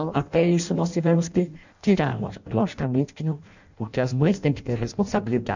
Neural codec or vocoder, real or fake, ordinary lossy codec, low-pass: codec, 16 kHz in and 24 kHz out, 0.6 kbps, FireRedTTS-2 codec; fake; none; 7.2 kHz